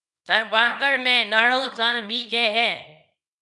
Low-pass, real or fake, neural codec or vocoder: 10.8 kHz; fake; codec, 24 kHz, 0.9 kbps, WavTokenizer, small release